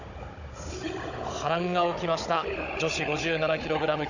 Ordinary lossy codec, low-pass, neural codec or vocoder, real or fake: none; 7.2 kHz; codec, 16 kHz, 16 kbps, FunCodec, trained on Chinese and English, 50 frames a second; fake